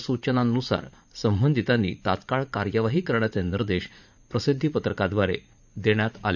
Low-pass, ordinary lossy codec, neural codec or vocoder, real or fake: 7.2 kHz; none; vocoder, 44.1 kHz, 80 mel bands, Vocos; fake